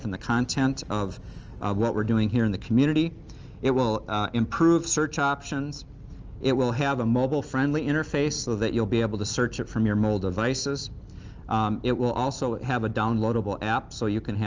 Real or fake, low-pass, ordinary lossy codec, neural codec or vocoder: real; 7.2 kHz; Opus, 24 kbps; none